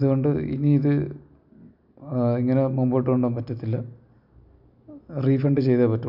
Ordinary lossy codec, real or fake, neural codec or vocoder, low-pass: none; real; none; 5.4 kHz